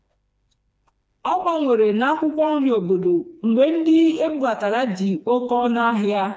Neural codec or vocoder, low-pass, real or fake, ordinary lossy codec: codec, 16 kHz, 2 kbps, FreqCodec, smaller model; none; fake; none